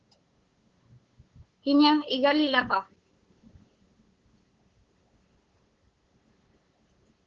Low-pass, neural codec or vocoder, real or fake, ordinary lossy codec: 7.2 kHz; codec, 16 kHz, 8 kbps, FunCodec, trained on LibriTTS, 25 frames a second; fake; Opus, 16 kbps